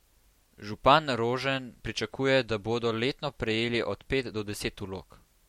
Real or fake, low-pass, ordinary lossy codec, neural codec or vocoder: real; 19.8 kHz; MP3, 64 kbps; none